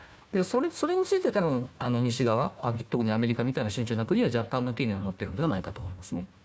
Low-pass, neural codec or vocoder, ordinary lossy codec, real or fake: none; codec, 16 kHz, 1 kbps, FunCodec, trained on Chinese and English, 50 frames a second; none; fake